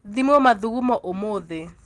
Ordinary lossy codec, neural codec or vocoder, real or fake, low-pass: Opus, 24 kbps; none; real; 10.8 kHz